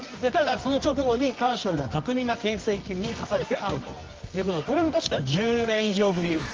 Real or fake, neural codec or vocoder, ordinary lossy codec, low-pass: fake; codec, 24 kHz, 0.9 kbps, WavTokenizer, medium music audio release; Opus, 24 kbps; 7.2 kHz